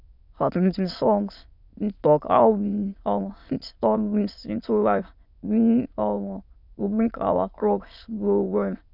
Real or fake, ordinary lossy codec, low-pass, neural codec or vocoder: fake; none; 5.4 kHz; autoencoder, 22.05 kHz, a latent of 192 numbers a frame, VITS, trained on many speakers